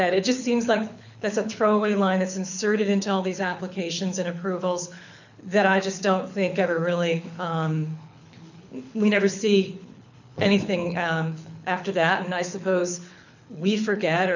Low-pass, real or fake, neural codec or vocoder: 7.2 kHz; fake; codec, 24 kHz, 6 kbps, HILCodec